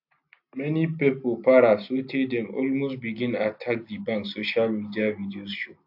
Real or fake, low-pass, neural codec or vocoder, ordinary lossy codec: real; 5.4 kHz; none; AAC, 48 kbps